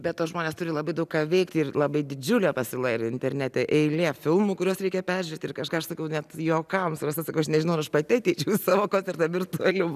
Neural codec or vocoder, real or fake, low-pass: none; real; 14.4 kHz